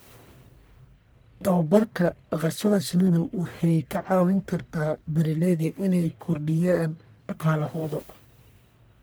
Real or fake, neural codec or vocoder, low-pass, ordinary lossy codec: fake; codec, 44.1 kHz, 1.7 kbps, Pupu-Codec; none; none